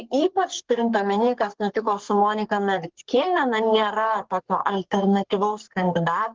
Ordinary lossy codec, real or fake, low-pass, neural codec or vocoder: Opus, 16 kbps; fake; 7.2 kHz; codec, 44.1 kHz, 3.4 kbps, Pupu-Codec